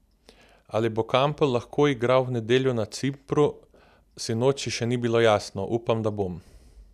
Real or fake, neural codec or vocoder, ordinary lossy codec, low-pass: real; none; none; 14.4 kHz